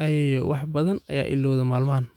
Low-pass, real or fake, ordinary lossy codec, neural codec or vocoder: 19.8 kHz; fake; none; autoencoder, 48 kHz, 128 numbers a frame, DAC-VAE, trained on Japanese speech